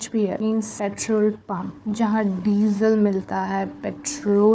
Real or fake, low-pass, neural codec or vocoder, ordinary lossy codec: fake; none; codec, 16 kHz, 4 kbps, FunCodec, trained on Chinese and English, 50 frames a second; none